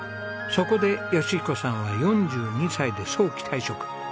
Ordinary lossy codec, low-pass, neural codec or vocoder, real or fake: none; none; none; real